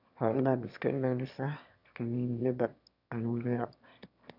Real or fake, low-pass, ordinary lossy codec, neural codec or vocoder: fake; 5.4 kHz; none; autoencoder, 22.05 kHz, a latent of 192 numbers a frame, VITS, trained on one speaker